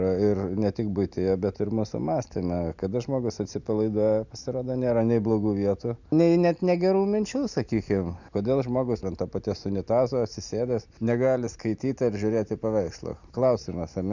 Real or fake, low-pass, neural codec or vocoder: real; 7.2 kHz; none